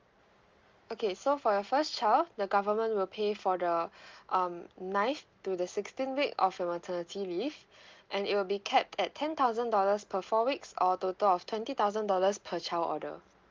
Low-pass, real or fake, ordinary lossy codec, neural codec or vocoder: 7.2 kHz; real; Opus, 32 kbps; none